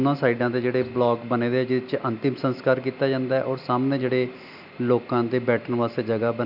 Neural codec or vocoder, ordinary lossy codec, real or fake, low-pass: none; none; real; 5.4 kHz